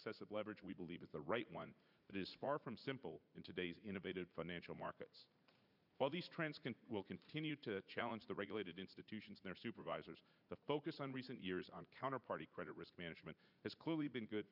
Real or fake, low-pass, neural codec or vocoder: fake; 5.4 kHz; vocoder, 44.1 kHz, 80 mel bands, Vocos